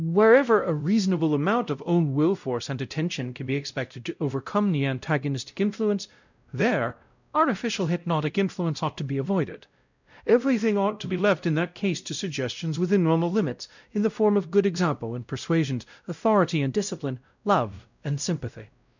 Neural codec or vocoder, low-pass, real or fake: codec, 16 kHz, 0.5 kbps, X-Codec, WavLM features, trained on Multilingual LibriSpeech; 7.2 kHz; fake